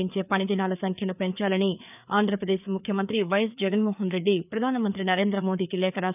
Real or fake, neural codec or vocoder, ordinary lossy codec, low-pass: fake; codec, 16 kHz, 4 kbps, FreqCodec, larger model; none; 3.6 kHz